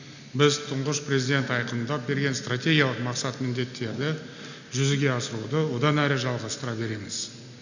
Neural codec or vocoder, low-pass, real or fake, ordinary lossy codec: none; 7.2 kHz; real; none